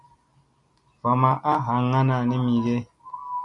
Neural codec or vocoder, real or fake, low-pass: none; real; 10.8 kHz